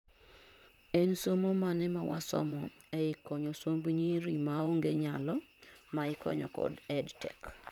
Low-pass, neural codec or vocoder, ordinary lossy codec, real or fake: 19.8 kHz; vocoder, 44.1 kHz, 128 mel bands, Pupu-Vocoder; none; fake